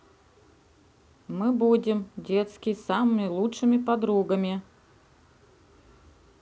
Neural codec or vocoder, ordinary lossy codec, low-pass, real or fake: none; none; none; real